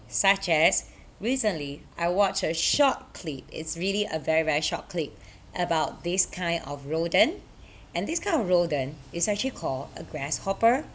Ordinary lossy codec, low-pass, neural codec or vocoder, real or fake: none; none; none; real